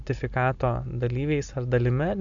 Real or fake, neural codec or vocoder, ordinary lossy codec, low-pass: real; none; AAC, 64 kbps; 7.2 kHz